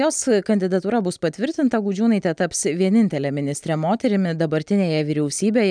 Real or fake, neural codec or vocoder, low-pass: real; none; 9.9 kHz